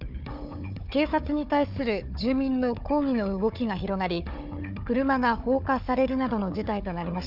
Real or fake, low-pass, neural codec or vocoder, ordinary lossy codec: fake; 5.4 kHz; codec, 16 kHz, 4 kbps, FunCodec, trained on Chinese and English, 50 frames a second; none